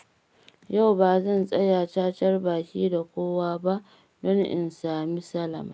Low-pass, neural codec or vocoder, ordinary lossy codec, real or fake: none; none; none; real